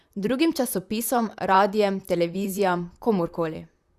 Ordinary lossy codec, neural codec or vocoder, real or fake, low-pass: Opus, 64 kbps; vocoder, 44.1 kHz, 128 mel bands, Pupu-Vocoder; fake; 14.4 kHz